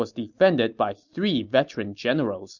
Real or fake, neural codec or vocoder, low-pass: real; none; 7.2 kHz